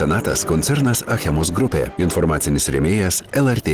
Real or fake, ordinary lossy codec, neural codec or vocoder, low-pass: real; Opus, 16 kbps; none; 14.4 kHz